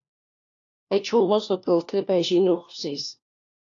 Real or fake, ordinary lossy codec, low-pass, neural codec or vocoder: fake; AAC, 48 kbps; 7.2 kHz; codec, 16 kHz, 1 kbps, FunCodec, trained on LibriTTS, 50 frames a second